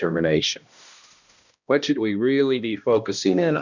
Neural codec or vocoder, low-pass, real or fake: codec, 16 kHz, 1 kbps, X-Codec, HuBERT features, trained on balanced general audio; 7.2 kHz; fake